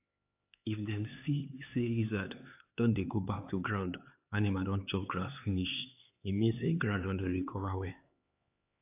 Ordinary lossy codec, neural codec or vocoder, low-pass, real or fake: AAC, 24 kbps; codec, 16 kHz, 4 kbps, X-Codec, HuBERT features, trained on LibriSpeech; 3.6 kHz; fake